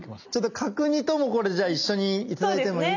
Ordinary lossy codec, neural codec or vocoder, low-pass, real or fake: none; none; 7.2 kHz; real